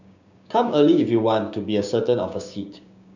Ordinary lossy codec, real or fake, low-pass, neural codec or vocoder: none; fake; 7.2 kHz; codec, 16 kHz, 6 kbps, DAC